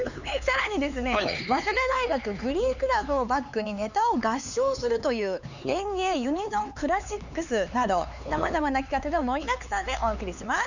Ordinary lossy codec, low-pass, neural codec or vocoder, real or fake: none; 7.2 kHz; codec, 16 kHz, 4 kbps, X-Codec, HuBERT features, trained on LibriSpeech; fake